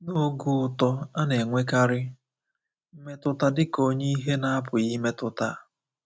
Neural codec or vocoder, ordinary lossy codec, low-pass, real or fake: none; none; none; real